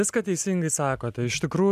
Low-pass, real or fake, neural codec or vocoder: 14.4 kHz; real; none